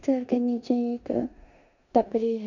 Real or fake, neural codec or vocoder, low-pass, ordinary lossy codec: fake; codec, 16 kHz in and 24 kHz out, 0.9 kbps, LongCat-Audio-Codec, four codebook decoder; 7.2 kHz; none